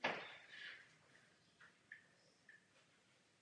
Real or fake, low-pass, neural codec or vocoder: real; 9.9 kHz; none